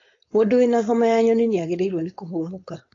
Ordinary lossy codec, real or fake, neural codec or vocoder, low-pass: AAC, 32 kbps; fake; codec, 16 kHz, 4.8 kbps, FACodec; 7.2 kHz